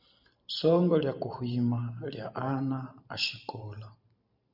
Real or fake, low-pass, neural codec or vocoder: fake; 5.4 kHz; vocoder, 44.1 kHz, 128 mel bands every 512 samples, BigVGAN v2